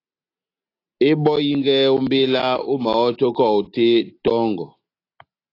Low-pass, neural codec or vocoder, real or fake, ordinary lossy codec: 5.4 kHz; none; real; AAC, 32 kbps